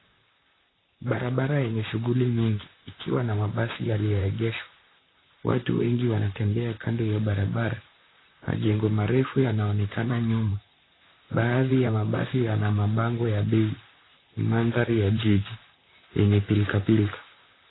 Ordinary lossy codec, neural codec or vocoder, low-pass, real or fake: AAC, 16 kbps; vocoder, 44.1 kHz, 128 mel bands, Pupu-Vocoder; 7.2 kHz; fake